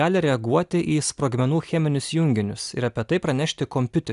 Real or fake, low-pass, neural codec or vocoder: real; 10.8 kHz; none